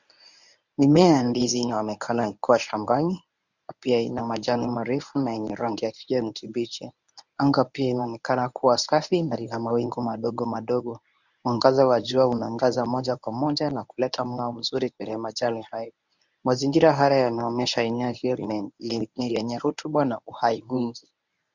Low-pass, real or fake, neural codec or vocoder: 7.2 kHz; fake; codec, 24 kHz, 0.9 kbps, WavTokenizer, medium speech release version 1